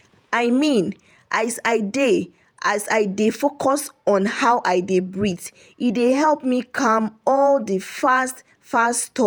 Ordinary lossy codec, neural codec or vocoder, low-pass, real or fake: none; vocoder, 48 kHz, 128 mel bands, Vocos; none; fake